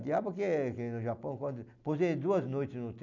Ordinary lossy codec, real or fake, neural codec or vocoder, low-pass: none; real; none; 7.2 kHz